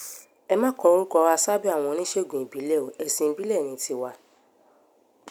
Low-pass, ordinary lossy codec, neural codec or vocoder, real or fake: none; none; none; real